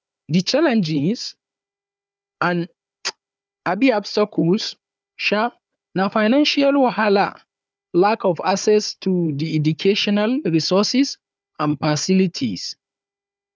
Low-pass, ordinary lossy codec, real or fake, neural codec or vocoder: none; none; fake; codec, 16 kHz, 4 kbps, FunCodec, trained on Chinese and English, 50 frames a second